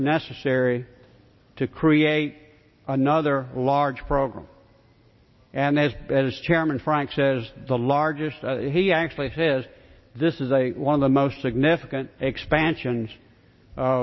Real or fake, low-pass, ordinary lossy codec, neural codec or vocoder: real; 7.2 kHz; MP3, 24 kbps; none